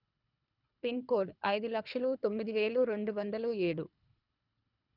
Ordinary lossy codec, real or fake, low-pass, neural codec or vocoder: none; fake; 5.4 kHz; codec, 24 kHz, 3 kbps, HILCodec